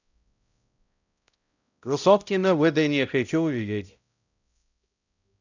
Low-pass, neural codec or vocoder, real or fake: 7.2 kHz; codec, 16 kHz, 0.5 kbps, X-Codec, HuBERT features, trained on balanced general audio; fake